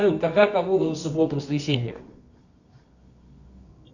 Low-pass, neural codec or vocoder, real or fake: 7.2 kHz; codec, 24 kHz, 0.9 kbps, WavTokenizer, medium music audio release; fake